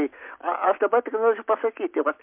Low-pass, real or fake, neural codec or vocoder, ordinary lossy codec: 3.6 kHz; real; none; MP3, 32 kbps